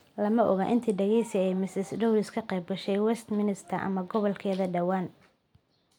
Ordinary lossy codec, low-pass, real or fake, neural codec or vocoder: none; 19.8 kHz; real; none